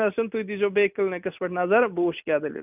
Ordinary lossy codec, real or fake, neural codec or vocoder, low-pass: none; real; none; 3.6 kHz